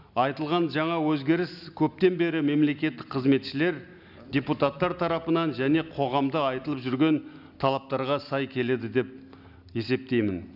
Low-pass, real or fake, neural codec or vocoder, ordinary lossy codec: 5.4 kHz; real; none; none